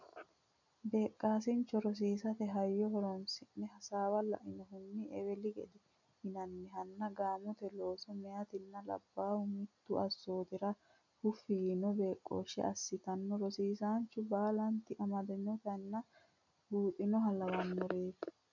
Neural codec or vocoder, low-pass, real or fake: none; 7.2 kHz; real